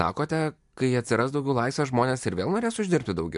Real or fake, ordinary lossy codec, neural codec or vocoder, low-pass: real; MP3, 64 kbps; none; 10.8 kHz